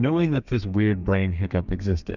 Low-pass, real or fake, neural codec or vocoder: 7.2 kHz; fake; codec, 32 kHz, 1.9 kbps, SNAC